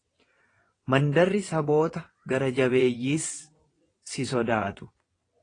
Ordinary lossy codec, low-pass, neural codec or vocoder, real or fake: AAC, 32 kbps; 9.9 kHz; vocoder, 22.05 kHz, 80 mel bands, WaveNeXt; fake